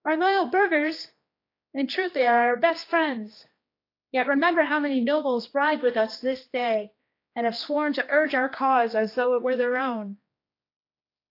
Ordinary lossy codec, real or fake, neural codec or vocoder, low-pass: AAC, 32 kbps; fake; codec, 16 kHz, 2 kbps, X-Codec, HuBERT features, trained on general audio; 5.4 kHz